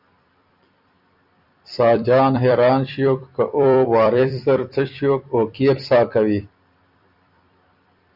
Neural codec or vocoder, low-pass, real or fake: vocoder, 44.1 kHz, 128 mel bands every 256 samples, BigVGAN v2; 5.4 kHz; fake